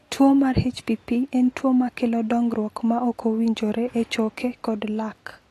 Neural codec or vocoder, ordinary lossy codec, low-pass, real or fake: none; AAC, 48 kbps; 14.4 kHz; real